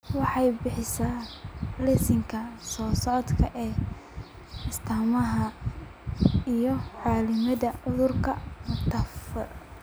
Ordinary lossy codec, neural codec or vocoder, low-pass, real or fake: none; none; none; real